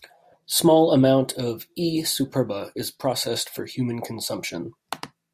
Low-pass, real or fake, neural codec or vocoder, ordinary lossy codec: 14.4 kHz; real; none; AAC, 96 kbps